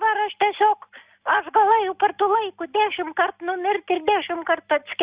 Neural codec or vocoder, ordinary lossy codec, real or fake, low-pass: none; Opus, 64 kbps; real; 3.6 kHz